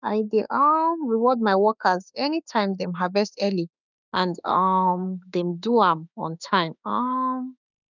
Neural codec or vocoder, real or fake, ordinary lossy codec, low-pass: autoencoder, 48 kHz, 32 numbers a frame, DAC-VAE, trained on Japanese speech; fake; none; 7.2 kHz